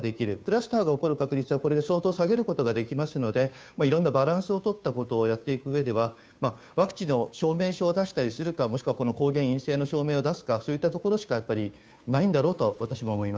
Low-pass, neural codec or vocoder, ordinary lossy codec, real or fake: none; codec, 16 kHz, 2 kbps, FunCodec, trained on Chinese and English, 25 frames a second; none; fake